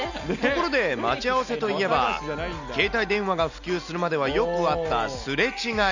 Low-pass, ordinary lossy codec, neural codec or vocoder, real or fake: 7.2 kHz; none; none; real